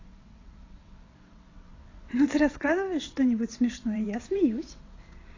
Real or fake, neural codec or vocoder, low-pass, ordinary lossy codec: fake; vocoder, 22.05 kHz, 80 mel bands, WaveNeXt; 7.2 kHz; AAC, 32 kbps